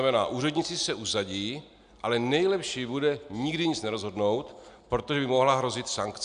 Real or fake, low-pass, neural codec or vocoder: real; 9.9 kHz; none